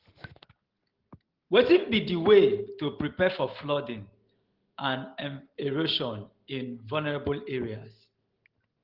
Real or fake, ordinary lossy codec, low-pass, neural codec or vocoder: real; Opus, 16 kbps; 5.4 kHz; none